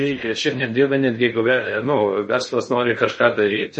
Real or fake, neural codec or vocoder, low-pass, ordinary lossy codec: fake; codec, 16 kHz in and 24 kHz out, 0.6 kbps, FocalCodec, streaming, 2048 codes; 10.8 kHz; MP3, 32 kbps